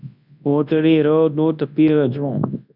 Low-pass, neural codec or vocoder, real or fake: 5.4 kHz; codec, 24 kHz, 0.9 kbps, WavTokenizer, large speech release; fake